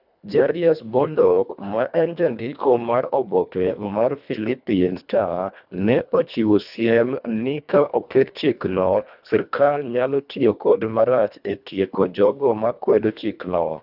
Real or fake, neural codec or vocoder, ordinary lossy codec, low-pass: fake; codec, 24 kHz, 1.5 kbps, HILCodec; none; 5.4 kHz